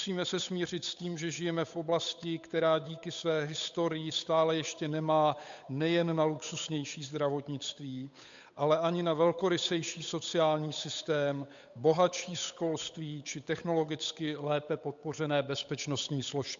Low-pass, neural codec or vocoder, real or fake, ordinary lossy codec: 7.2 kHz; codec, 16 kHz, 8 kbps, FunCodec, trained on Chinese and English, 25 frames a second; fake; MP3, 64 kbps